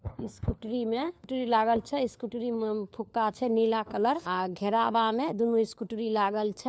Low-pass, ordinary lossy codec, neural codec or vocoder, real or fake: none; none; codec, 16 kHz, 4 kbps, FunCodec, trained on LibriTTS, 50 frames a second; fake